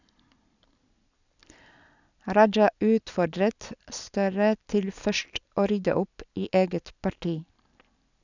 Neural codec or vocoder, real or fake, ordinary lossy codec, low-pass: none; real; none; 7.2 kHz